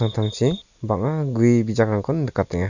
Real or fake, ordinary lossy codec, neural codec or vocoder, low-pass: real; none; none; 7.2 kHz